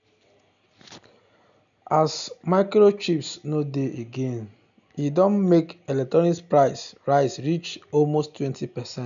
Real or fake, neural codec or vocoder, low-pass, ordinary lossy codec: real; none; 7.2 kHz; MP3, 96 kbps